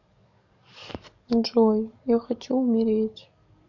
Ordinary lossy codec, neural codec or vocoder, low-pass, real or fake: none; codec, 44.1 kHz, 7.8 kbps, DAC; 7.2 kHz; fake